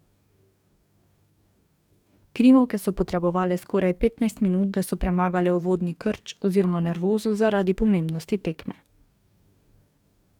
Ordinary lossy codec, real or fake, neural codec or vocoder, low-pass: none; fake; codec, 44.1 kHz, 2.6 kbps, DAC; 19.8 kHz